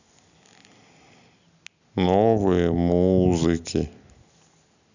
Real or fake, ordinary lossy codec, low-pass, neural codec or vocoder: real; none; 7.2 kHz; none